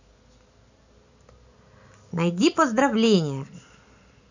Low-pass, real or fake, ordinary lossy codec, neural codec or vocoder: 7.2 kHz; real; none; none